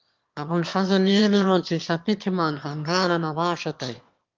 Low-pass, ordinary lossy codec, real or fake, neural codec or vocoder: 7.2 kHz; Opus, 32 kbps; fake; autoencoder, 22.05 kHz, a latent of 192 numbers a frame, VITS, trained on one speaker